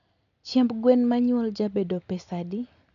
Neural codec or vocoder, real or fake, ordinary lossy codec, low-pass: none; real; none; 7.2 kHz